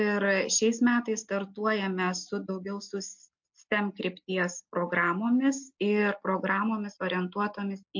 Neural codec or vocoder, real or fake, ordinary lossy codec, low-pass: none; real; MP3, 48 kbps; 7.2 kHz